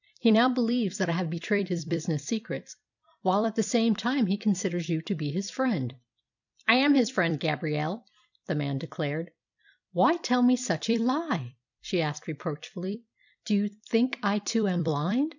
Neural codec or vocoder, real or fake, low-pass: vocoder, 44.1 kHz, 128 mel bands every 256 samples, BigVGAN v2; fake; 7.2 kHz